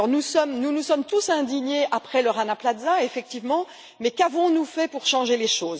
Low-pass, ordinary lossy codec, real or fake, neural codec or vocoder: none; none; real; none